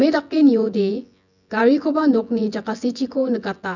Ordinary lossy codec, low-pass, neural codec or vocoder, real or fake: none; 7.2 kHz; vocoder, 24 kHz, 100 mel bands, Vocos; fake